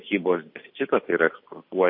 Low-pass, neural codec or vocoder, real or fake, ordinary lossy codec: 5.4 kHz; none; real; MP3, 32 kbps